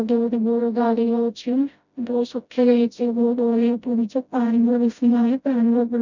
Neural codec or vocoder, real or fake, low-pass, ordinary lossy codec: codec, 16 kHz, 0.5 kbps, FreqCodec, smaller model; fake; 7.2 kHz; none